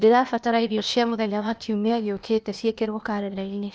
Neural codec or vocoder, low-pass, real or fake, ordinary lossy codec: codec, 16 kHz, 0.8 kbps, ZipCodec; none; fake; none